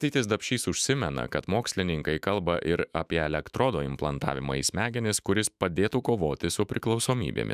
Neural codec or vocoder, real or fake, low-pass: autoencoder, 48 kHz, 128 numbers a frame, DAC-VAE, trained on Japanese speech; fake; 14.4 kHz